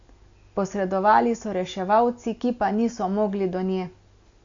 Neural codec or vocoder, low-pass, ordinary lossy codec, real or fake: none; 7.2 kHz; AAC, 48 kbps; real